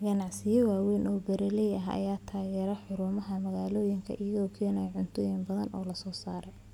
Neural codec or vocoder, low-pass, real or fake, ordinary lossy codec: none; 19.8 kHz; real; none